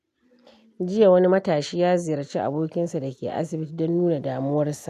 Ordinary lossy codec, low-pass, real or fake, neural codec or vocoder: none; 14.4 kHz; real; none